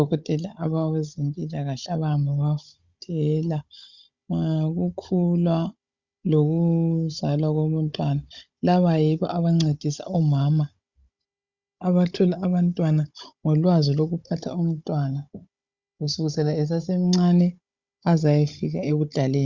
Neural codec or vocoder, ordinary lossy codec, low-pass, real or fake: codec, 16 kHz, 16 kbps, FunCodec, trained on Chinese and English, 50 frames a second; Opus, 64 kbps; 7.2 kHz; fake